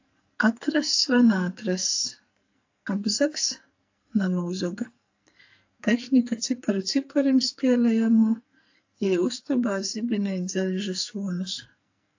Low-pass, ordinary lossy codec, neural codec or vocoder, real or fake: 7.2 kHz; AAC, 48 kbps; codec, 44.1 kHz, 2.6 kbps, SNAC; fake